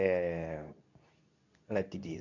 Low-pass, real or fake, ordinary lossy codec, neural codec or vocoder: 7.2 kHz; fake; none; codec, 24 kHz, 0.9 kbps, WavTokenizer, medium speech release version 2